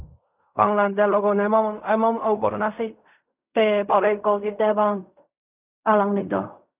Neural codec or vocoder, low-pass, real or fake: codec, 16 kHz in and 24 kHz out, 0.4 kbps, LongCat-Audio-Codec, fine tuned four codebook decoder; 3.6 kHz; fake